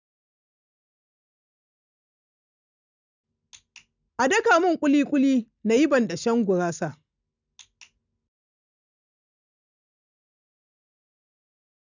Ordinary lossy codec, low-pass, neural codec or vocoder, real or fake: none; 7.2 kHz; none; real